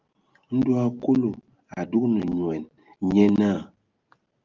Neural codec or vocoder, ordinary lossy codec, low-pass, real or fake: none; Opus, 24 kbps; 7.2 kHz; real